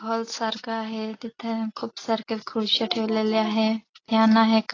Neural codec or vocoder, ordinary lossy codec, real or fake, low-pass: none; AAC, 32 kbps; real; 7.2 kHz